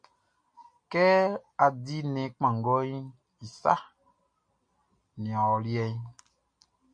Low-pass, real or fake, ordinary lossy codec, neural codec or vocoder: 9.9 kHz; real; MP3, 64 kbps; none